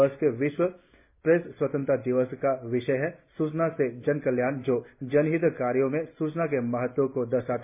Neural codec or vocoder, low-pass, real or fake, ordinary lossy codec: none; 3.6 kHz; real; MP3, 24 kbps